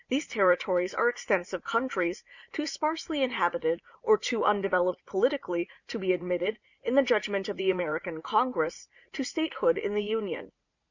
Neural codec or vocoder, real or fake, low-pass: vocoder, 44.1 kHz, 80 mel bands, Vocos; fake; 7.2 kHz